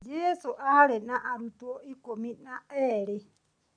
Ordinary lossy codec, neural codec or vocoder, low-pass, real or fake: none; none; 9.9 kHz; real